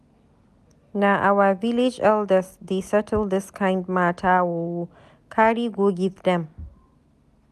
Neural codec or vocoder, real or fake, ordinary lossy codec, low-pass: none; real; none; 14.4 kHz